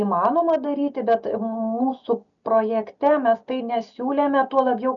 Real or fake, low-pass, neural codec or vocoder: real; 7.2 kHz; none